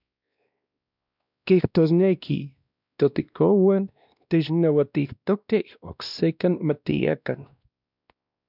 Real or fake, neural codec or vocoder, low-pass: fake; codec, 16 kHz, 1 kbps, X-Codec, WavLM features, trained on Multilingual LibriSpeech; 5.4 kHz